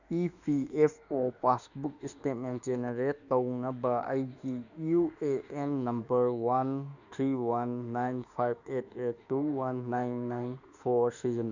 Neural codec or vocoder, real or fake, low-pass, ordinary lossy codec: autoencoder, 48 kHz, 32 numbers a frame, DAC-VAE, trained on Japanese speech; fake; 7.2 kHz; none